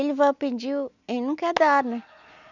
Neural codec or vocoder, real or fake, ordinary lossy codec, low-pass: none; real; none; 7.2 kHz